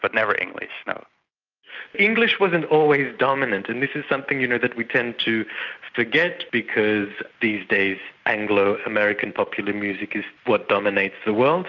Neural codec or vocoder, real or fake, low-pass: none; real; 7.2 kHz